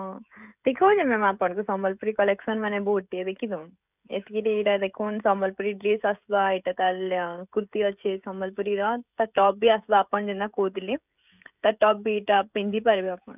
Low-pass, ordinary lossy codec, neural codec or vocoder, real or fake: 3.6 kHz; none; codec, 16 kHz, 16 kbps, FreqCodec, smaller model; fake